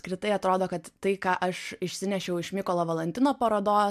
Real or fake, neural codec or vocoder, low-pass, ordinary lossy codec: real; none; 14.4 kHz; MP3, 96 kbps